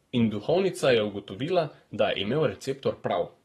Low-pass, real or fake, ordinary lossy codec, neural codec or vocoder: 19.8 kHz; fake; AAC, 32 kbps; codec, 44.1 kHz, 7.8 kbps, Pupu-Codec